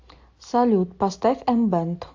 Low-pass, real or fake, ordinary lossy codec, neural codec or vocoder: 7.2 kHz; real; AAC, 48 kbps; none